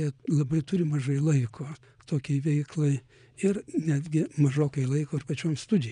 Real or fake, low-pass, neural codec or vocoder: fake; 9.9 kHz; vocoder, 22.05 kHz, 80 mel bands, Vocos